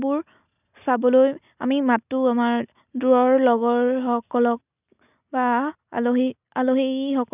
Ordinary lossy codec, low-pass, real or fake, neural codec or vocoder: none; 3.6 kHz; real; none